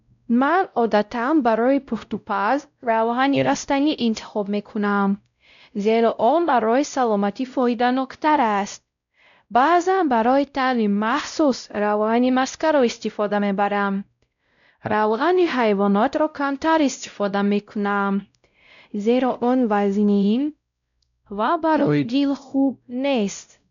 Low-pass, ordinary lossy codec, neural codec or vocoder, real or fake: 7.2 kHz; MP3, 96 kbps; codec, 16 kHz, 0.5 kbps, X-Codec, WavLM features, trained on Multilingual LibriSpeech; fake